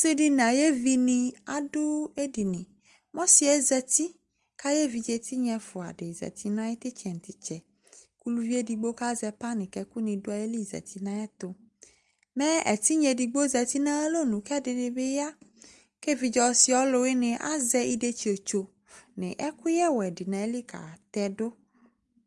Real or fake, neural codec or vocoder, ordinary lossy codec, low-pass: real; none; Opus, 32 kbps; 10.8 kHz